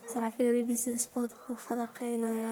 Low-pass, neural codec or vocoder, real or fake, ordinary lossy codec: none; codec, 44.1 kHz, 1.7 kbps, Pupu-Codec; fake; none